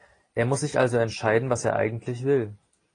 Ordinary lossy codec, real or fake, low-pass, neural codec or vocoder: AAC, 32 kbps; real; 9.9 kHz; none